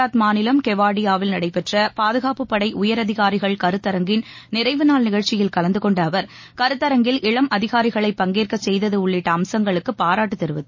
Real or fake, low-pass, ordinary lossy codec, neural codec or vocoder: real; 7.2 kHz; MP3, 32 kbps; none